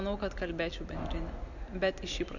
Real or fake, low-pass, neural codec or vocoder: real; 7.2 kHz; none